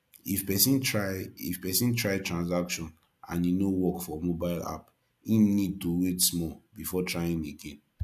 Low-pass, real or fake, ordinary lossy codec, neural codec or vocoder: 14.4 kHz; real; none; none